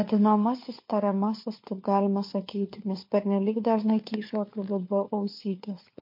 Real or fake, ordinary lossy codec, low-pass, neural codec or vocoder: fake; MP3, 32 kbps; 5.4 kHz; codec, 16 kHz, 4 kbps, FunCodec, trained on LibriTTS, 50 frames a second